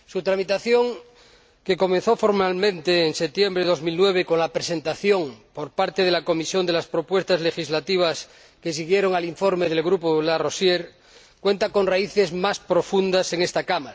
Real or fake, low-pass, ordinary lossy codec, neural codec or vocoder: real; none; none; none